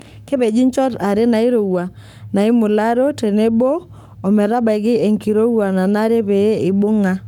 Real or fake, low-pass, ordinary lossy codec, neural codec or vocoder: fake; 19.8 kHz; none; codec, 44.1 kHz, 7.8 kbps, Pupu-Codec